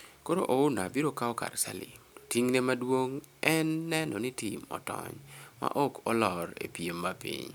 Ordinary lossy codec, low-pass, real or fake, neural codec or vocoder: none; none; real; none